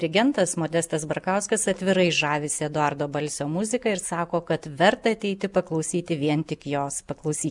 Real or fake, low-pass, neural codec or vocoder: real; 10.8 kHz; none